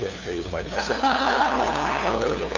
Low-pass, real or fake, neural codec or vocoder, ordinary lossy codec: 7.2 kHz; fake; codec, 16 kHz, 2 kbps, FunCodec, trained on LibriTTS, 25 frames a second; none